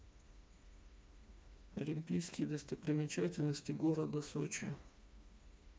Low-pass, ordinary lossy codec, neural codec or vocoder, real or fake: none; none; codec, 16 kHz, 2 kbps, FreqCodec, smaller model; fake